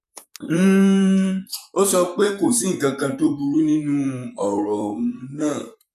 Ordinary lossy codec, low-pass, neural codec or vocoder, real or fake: none; 14.4 kHz; vocoder, 44.1 kHz, 128 mel bands, Pupu-Vocoder; fake